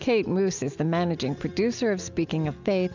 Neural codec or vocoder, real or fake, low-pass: none; real; 7.2 kHz